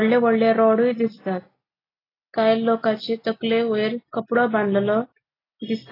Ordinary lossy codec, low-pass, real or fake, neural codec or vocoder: AAC, 24 kbps; 5.4 kHz; real; none